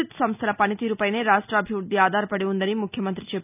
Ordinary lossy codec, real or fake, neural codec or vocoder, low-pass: none; real; none; 3.6 kHz